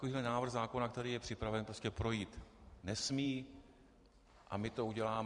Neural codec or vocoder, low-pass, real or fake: vocoder, 44.1 kHz, 128 mel bands every 512 samples, BigVGAN v2; 10.8 kHz; fake